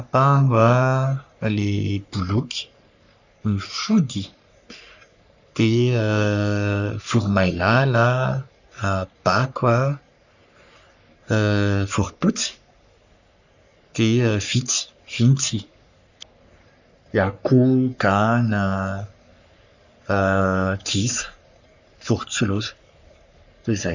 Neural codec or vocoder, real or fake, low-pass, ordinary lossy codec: codec, 44.1 kHz, 3.4 kbps, Pupu-Codec; fake; 7.2 kHz; none